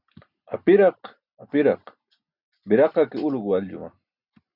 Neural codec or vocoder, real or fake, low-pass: none; real; 5.4 kHz